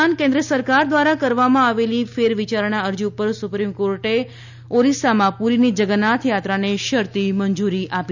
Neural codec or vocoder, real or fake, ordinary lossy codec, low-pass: none; real; none; 7.2 kHz